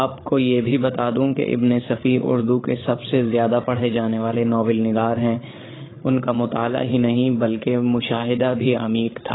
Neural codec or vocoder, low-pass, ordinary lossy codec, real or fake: codec, 16 kHz, 8 kbps, FreqCodec, larger model; 7.2 kHz; AAC, 16 kbps; fake